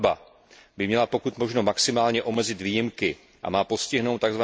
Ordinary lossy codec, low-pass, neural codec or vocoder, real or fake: none; none; none; real